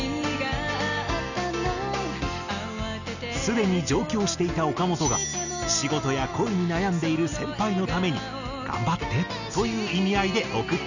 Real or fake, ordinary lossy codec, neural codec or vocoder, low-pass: real; none; none; 7.2 kHz